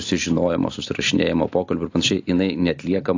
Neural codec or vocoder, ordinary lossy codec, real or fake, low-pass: none; AAC, 48 kbps; real; 7.2 kHz